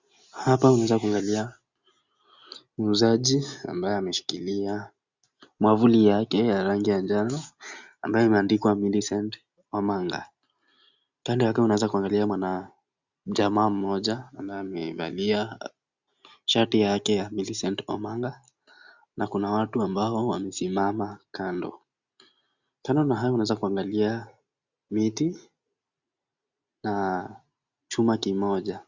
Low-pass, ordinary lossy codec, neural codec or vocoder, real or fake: 7.2 kHz; Opus, 64 kbps; none; real